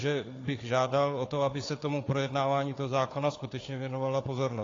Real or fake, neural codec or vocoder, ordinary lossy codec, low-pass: fake; codec, 16 kHz, 4 kbps, FunCodec, trained on Chinese and English, 50 frames a second; AAC, 32 kbps; 7.2 kHz